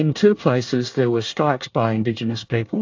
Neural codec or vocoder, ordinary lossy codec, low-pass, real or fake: codec, 24 kHz, 1 kbps, SNAC; AAC, 48 kbps; 7.2 kHz; fake